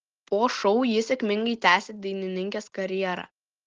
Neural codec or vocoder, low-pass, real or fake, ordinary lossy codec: none; 7.2 kHz; real; Opus, 16 kbps